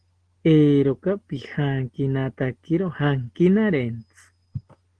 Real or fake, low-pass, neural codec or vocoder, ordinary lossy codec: real; 9.9 kHz; none; Opus, 16 kbps